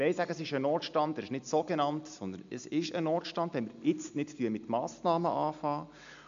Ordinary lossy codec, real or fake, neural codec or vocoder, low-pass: MP3, 64 kbps; real; none; 7.2 kHz